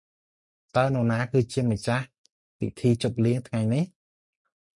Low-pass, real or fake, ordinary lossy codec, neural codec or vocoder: 10.8 kHz; real; MP3, 48 kbps; none